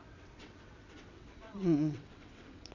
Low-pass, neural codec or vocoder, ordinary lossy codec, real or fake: 7.2 kHz; vocoder, 22.05 kHz, 80 mel bands, WaveNeXt; none; fake